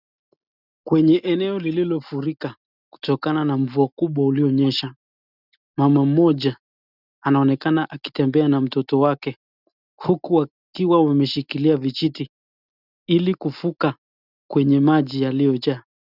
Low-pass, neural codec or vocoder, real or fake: 5.4 kHz; none; real